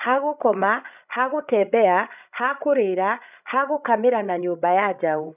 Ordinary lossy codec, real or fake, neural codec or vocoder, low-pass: none; fake; codec, 16 kHz, 16 kbps, FreqCodec, larger model; 3.6 kHz